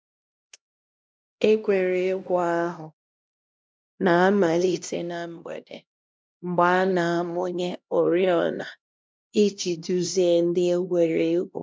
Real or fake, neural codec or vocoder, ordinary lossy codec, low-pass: fake; codec, 16 kHz, 1 kbps, X-Codec, HuBERT features, trained on LibriSpeech; none; none